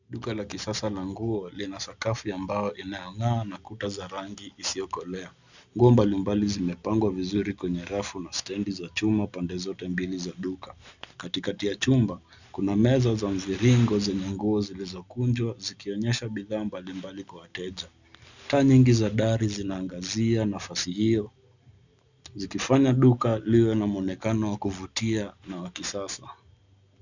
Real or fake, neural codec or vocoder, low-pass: real; none; 7.2 kHz